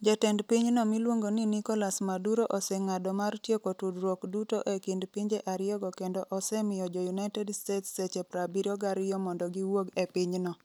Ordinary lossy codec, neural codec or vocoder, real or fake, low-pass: none; none; real; none